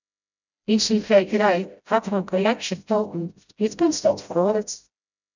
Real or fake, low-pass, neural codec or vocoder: fake; 7.2 kHz; codec, 16 kHz, 0.5 kbps, FreqCodec, smaller model